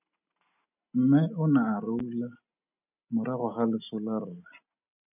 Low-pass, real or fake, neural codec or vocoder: 3.6 kHz; real; none